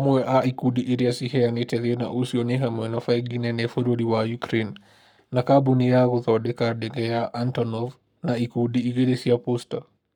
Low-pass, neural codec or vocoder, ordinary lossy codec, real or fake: 19.8 kHz; codec, 44.1 kHz, 7.8 kbps, Pupu-Codec; none; fake